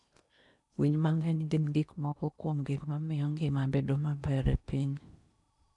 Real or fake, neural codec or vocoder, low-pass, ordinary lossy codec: fake; codec, 16 kHz in and 24 kHz out, 0.8 kbps, FocalCodec, streaming, 65536 codes; 10.8 kHz; none